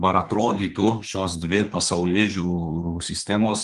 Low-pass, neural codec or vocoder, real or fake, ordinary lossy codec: 10.8 kHz; codec, 24 kHz, 1 kbps, SNAC; fake; Opus, 24 kbps